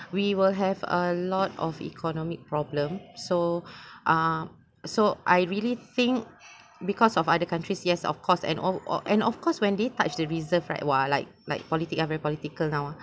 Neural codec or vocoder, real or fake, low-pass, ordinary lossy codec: none; real; none; none